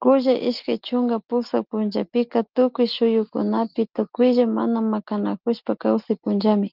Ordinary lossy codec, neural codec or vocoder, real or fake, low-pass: Opus, 32 kbps; none; real; 5.4 kHz